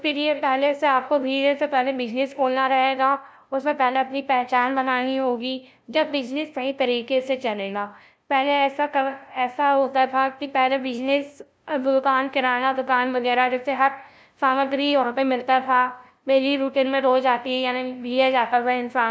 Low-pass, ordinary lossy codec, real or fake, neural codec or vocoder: none; none; fake; codec, 16 kHz, 0.5 kbps, FunCodec, trained on LibriTTS, 25 frames a second